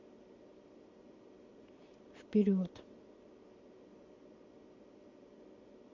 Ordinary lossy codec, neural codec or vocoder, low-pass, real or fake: MP3, 48 kbps; none; 7.2 kHz; real